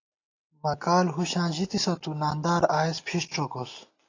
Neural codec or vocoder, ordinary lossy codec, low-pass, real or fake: none; AAC, 32 kbps; 7.2 kHz; real